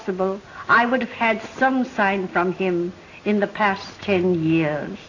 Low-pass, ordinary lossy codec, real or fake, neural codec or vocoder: 7.2 kHz; AAC, 32 kbps; real; none